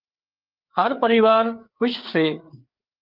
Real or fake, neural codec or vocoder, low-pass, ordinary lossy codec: fake; codec, 16 kHz, 4 kbps, FreqCodec, larger model; 5.4 kHz; Opus, 32 kbps